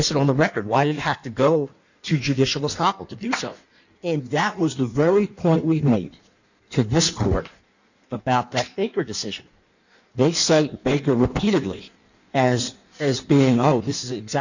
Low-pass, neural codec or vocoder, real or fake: 7.2 kHz; codec, 16 kHz in and 24 kHz out, 1.1 kbps, FireRedTTS-2 codec; fake